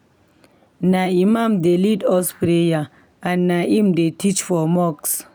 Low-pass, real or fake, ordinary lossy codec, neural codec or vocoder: none; real; none; none